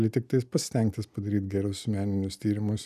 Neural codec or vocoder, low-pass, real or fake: none; 14.4 kHz; real